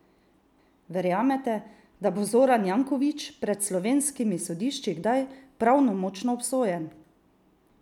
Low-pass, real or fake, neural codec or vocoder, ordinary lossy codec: 19.8 kHz; real; none; none